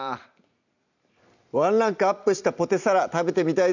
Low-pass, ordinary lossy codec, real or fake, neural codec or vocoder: 7.2 kHz; none; fake; vocoder, 44.1 kHz, 128 mel bands every 256 samples, BigVGAN v2